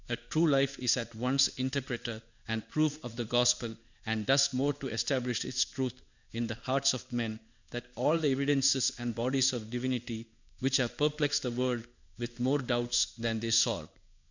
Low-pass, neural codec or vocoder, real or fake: 7.2 kHz; codec, 16 kHz in and 24 kHz out, 1 kbps, XY-Tokenizer; fake